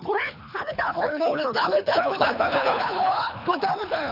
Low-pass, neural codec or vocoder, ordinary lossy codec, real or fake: 5.4 kHz; codec, 24 kHz, 3 kbps, HILCodec; none; fake